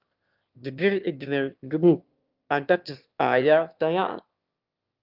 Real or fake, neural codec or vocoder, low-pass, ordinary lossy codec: fake; autoencoder, 22.05 kHz, a latent of 192 numbers a frame, VITS, trained on one speaker; 5.4 kHz; Opus, 24 kbps